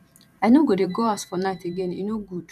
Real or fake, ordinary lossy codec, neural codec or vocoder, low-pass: fake; none; vocoder, 44.1 kHz, 128 mel bands every 512 samples, BigVGAN v2; 14.4 kHz